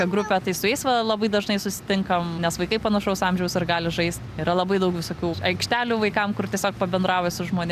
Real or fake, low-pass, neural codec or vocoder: real; 14.4 kHz; none